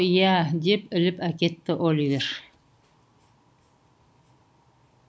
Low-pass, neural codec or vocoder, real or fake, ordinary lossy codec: none; none; real; none